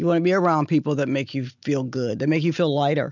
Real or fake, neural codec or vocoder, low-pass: real; none; 7.2 kHz